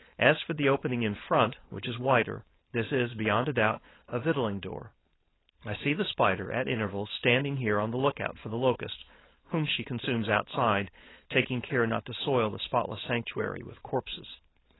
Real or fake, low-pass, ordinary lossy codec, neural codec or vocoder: fake; 7.2 kHz; AAC, 16 kbps; codec, 16 kHz, 4.8 kbps, FACodec